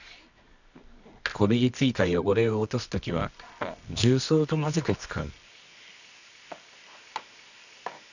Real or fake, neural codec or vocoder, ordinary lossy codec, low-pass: fake; codec, 24 kHz, 0.9 kbps, WavTokenizer, medium music audio release; none; 7.2 kHz